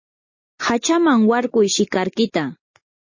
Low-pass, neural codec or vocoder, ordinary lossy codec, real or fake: 7.2 kHz; none; MP3, 32 kbps; real